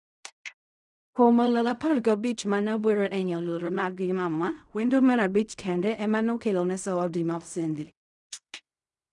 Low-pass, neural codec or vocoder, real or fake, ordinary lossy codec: 10.8 kHz; codec, 16 kHz in and 24 kHz out, 0.4 kbps, LongCat-Audio-Codec, fine tuned four codebook decoder; fake; none